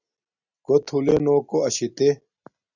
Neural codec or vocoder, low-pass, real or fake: none; 7.2 kHz; real